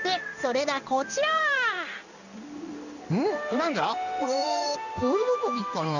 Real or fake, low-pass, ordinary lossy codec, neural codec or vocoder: fake; 7.2 kHz; none; codec, 16 kHz in and 24 kHz out, 1 kbps, XY-Tokenizer